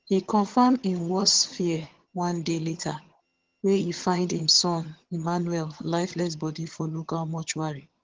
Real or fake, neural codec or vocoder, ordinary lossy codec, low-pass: fake; vocoder, 22.05 kHz, 80 mel bands, HiFi-GAN; Opus, 16 kbps; 7.2 kHz